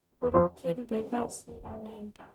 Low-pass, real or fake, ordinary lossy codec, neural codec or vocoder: 19.8 kHz; fake; none; codec, 44.1 kHz, 0.9 kbps, DAC